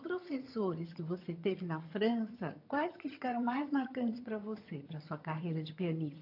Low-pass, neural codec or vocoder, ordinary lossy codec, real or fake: 5.4 kHz; vocoder, 22.05 kHz, 80 mel bands, HiFi-GAN; AAC, 32 kbps; fake